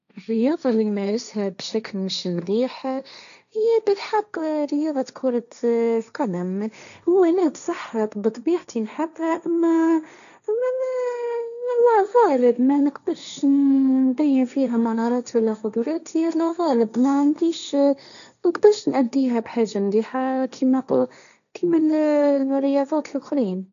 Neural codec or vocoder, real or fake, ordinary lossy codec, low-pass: codec, 16 kHz, 1.1 kbps, Voila-Tokenizer; fake; none; 7.2 kHz